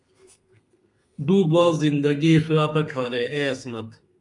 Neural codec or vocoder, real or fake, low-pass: codec, 32 kHz, 1.9 kbps, SNAC; fake; 10.8 kHz